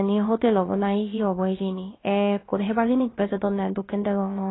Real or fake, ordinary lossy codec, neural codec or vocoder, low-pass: fake; AAC, 16 kbps; codec, 16 kHz, about 1 kbps, DyCAST, with the encoder's durations; 7.2 kHz